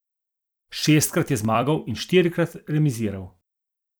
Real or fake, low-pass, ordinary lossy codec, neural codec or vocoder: fake; none; none; vocoder, 44.1 kHz, 128 mel bands every 256 samples, BigVGAN v2